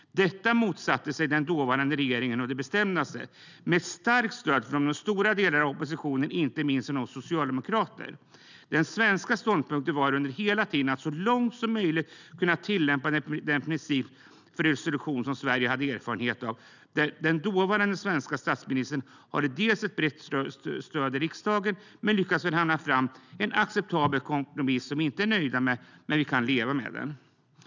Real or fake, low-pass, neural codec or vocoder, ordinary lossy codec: real; 7.2 kHz; none; none